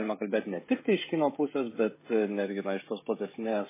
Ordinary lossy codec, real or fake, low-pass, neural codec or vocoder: MP3, 16 kbps; fake; 3.6 kHz; codec, 16 kHz, 16 kbps, FreqCodec, smaller model